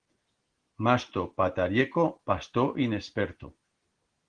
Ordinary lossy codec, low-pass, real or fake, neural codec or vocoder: Opus, 16 kbps; 9.9 kHz; real; none